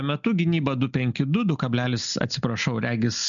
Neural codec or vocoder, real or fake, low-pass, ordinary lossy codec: none; real; 7.2 kHz; MP3, 96 kbps